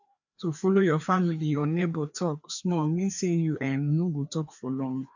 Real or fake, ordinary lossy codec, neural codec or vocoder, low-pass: fake; none; codec, 16 kHz, 2 kbps, FreqCodec, larger model; 7.2 kHz